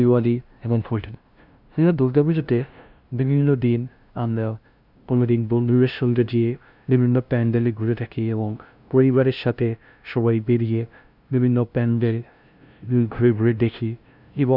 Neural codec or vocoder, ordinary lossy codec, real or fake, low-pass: codec, 16 kHz, 0.5 kbps, FunCodec, trained on LibriTTS, 25 frames a second; none; fake; 5.4 kHz